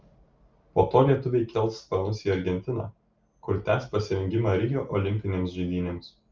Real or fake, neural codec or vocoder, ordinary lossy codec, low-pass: real; none; Opus, 32 kbps; 7.2 kHz